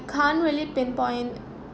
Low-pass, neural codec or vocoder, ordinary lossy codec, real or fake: none; none; none; real